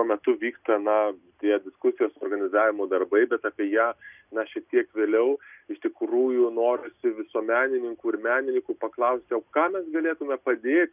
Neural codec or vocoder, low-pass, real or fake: none; 3.6 kHz; real